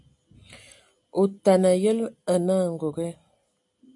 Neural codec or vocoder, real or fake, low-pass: none; real; 10.8 kHz